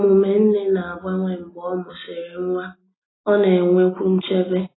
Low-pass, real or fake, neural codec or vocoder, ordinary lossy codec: 7.2 kHz; real; none; AAC, 16 kbps